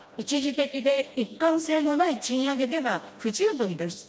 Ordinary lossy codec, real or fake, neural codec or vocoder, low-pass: none; fake; codec, 16 kHz, 1 kbps, FreqCodec, smaller model; none